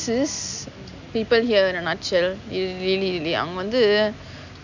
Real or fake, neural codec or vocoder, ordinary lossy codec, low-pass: real; none; none; 7.2 kHz